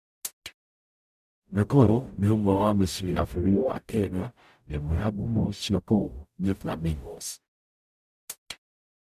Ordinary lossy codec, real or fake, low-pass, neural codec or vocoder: none; fake; 14.4 kHz; codec, 44.1 kHz, 0.9 kbps, DAC